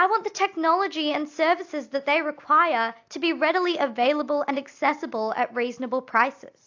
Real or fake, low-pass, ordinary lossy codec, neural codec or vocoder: real; 7.2 kHz; AAC, 48 kbps; none